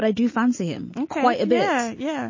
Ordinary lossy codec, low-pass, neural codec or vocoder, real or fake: MP3, 32 kbps; 7.2 kHz; none; real